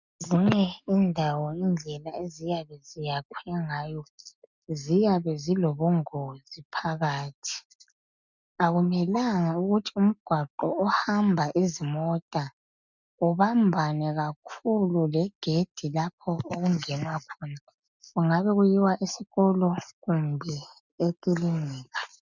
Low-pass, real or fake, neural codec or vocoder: 7.2 kHz; real; none